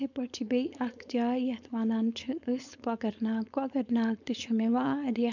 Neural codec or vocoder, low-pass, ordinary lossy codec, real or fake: codec, 16 kHz, 4.8 kbps, FACodec; 7.2 kHz; none; fake